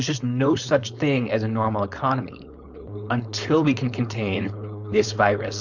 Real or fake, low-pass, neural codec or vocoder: fake; 7.2 kHz; codec, 16 kHz, 4.8 kbps, FACodec